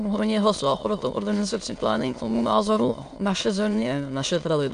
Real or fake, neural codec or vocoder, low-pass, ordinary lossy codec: fake; autoencoder, 22.05 kHz, a latent of 192 numbers a frame, VITS, trained on many speakers; 9.9 kHz; AAC, 64 kbps